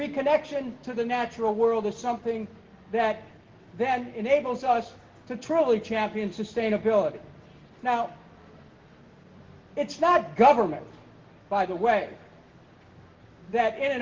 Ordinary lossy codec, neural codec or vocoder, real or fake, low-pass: Opus, 16 kbps; none; real; 7.2 kHz